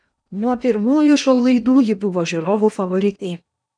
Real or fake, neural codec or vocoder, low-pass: fake; codec, 16 kHz in and 24 kHz out, 0.8 kbps, FocalCodec, streaming, 65536 codes; 9.9 kHz